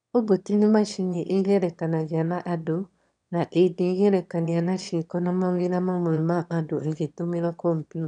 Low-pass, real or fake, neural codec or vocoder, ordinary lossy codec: 9.9 kHz; fake; autoencoder, 22.05 kHz, a latent of 192 numbers a frame, VITS, trained on one speaker; none